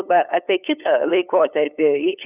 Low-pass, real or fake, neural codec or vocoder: 3.6 kHz; fake; codec, 16 kHz, 8 kbps, FunCodec, trained on LibriTTS, 25 frames a second